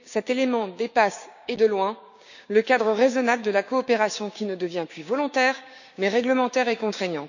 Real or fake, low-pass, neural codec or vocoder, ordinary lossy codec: fake; 7.2 kHz; codec, 16 kHz, 6 kbps, DAC; none